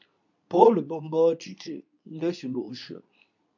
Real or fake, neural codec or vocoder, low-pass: fake; codec, 24 kHz, 0.9 kbps, WavTokenizer, medium speech release version 2; 7.2 kHz